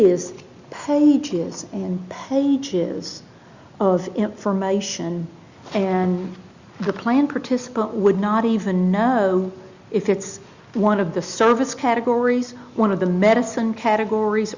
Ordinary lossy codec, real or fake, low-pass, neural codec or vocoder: Opus, 64 kbps; real; 7.2 kHz; none